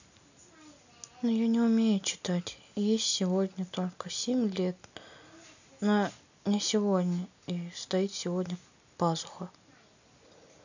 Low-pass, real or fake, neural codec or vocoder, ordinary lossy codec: 7.2 kHz; real; none; none